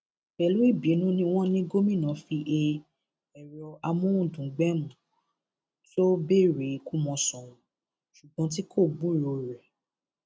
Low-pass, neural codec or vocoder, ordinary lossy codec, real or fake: none; none; none; real